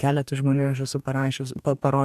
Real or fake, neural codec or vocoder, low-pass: fake; codec, 44.1 kHz, 2.6 kbps, DAC; 14.4 kHz